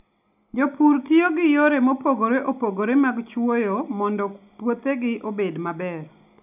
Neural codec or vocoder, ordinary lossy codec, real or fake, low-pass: none; none; real; 3.6 kHz